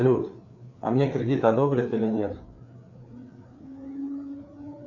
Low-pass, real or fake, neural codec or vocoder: 7.2 kHz; fake; codec, 16 kHz, 4 kbps, FreqCodec, larger model